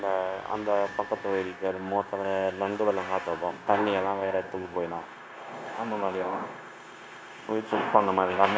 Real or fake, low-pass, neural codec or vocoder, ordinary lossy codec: fake; none; codec, 16 kHz, 0.9 kbps, LongCat-Audio-Codec; none